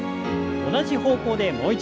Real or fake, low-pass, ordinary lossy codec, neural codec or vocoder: real; none; none; none